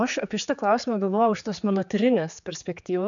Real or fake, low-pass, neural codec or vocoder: fake; 7.2 kHz; codec, 16 kHz, 4 kbps, X-Codec, HuBERT features, trained on general audio